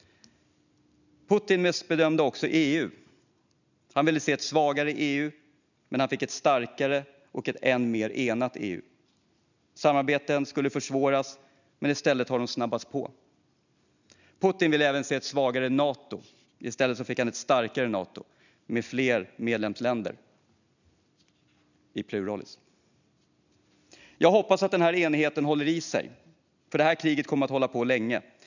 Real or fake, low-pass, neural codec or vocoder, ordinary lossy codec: real; 7.2 kHz; none; none